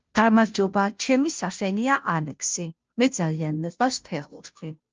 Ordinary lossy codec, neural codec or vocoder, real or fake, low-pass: Opus, 24 kbps; codec, 16 kHz, 0.5 kbps, FunCodec, trained on Chinese and English, 25 frames a second; fake; 7.2 kHz